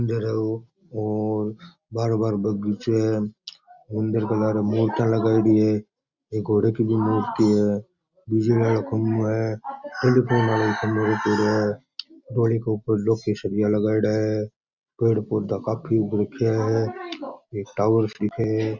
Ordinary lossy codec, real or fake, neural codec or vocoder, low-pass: Opus, 64 kbps; real; none; 7.2 kHz